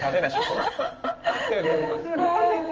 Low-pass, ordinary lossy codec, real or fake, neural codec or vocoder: 7.2 kHz; Opus, 24 kbps; fake; codec, 16 kHz in and 24 kHz out, 1 kbps, XY-Tokenizer